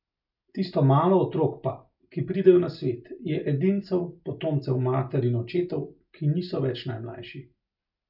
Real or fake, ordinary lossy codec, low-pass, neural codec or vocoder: real; none; 5.4 kHz; none